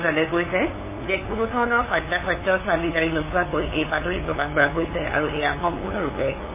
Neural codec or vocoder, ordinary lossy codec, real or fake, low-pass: codec, 16 kHz, 8 kbps, FunCodec, trained on LibriTTS, 25 frames a second; MP3, 16 kbps; fake; 3.6 kHz